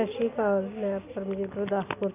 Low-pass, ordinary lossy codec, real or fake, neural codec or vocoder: 3.6 kHz; none; real; none